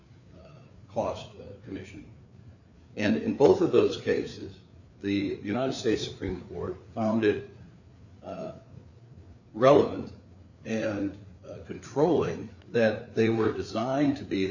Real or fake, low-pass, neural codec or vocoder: fake; 7.2 kHz; codec, 16 kHz, 4 kbps, FreqCodec, larger model